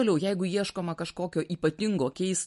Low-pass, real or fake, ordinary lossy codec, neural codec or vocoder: 14.4 kHz; real; MP3, 48 kbps; none